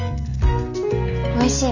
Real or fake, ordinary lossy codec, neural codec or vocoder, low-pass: real; none; none; 7.2 kHz